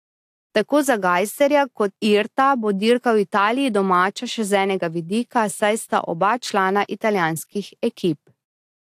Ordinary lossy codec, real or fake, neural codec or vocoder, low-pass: AAC, 64 kbps; real; none; 14.4 kHz